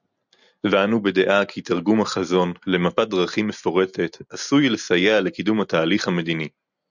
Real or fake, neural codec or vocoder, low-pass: real; none; 7.2 kHz